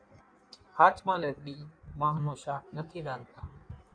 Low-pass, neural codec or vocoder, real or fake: 9.9 kHz; codec, 16 kHz in and 24 kHz out, 1.1 kbps, FireRedTTS-2 codec; fake